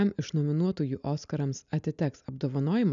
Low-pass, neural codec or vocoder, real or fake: 7.2 kHz; none; real